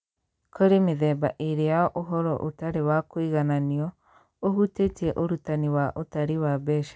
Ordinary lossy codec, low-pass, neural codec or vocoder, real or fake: none; none; none; real